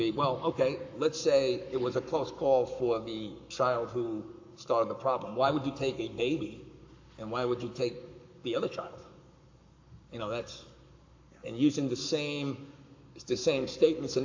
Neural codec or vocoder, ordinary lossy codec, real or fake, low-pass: codec, 44.1 kHz, 7.8 kbps, Pupu-Codec; AAC, 48 kbps; fake; 7.2 kHz